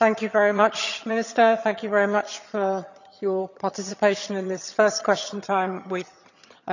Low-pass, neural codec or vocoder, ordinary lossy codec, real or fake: 7.2 kHz; vocoder, 22.05 kHz, 80 mel bands, HiFi-GAN; none; fake